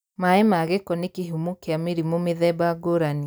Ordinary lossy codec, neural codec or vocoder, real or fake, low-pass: none; none; real; none